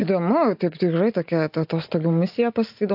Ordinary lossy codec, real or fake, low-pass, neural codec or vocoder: AAC, 48 kbps; real; 5.4 kHz; none